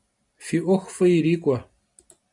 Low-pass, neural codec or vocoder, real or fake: 10.8 kHz; none; real